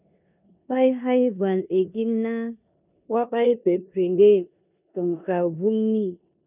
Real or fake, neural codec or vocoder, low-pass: fake; codec, 16 kHz in and 24 kHz out, 0.9 kbps, LongCat-Audio-Codec, four codebook decoder; 3.6 kHz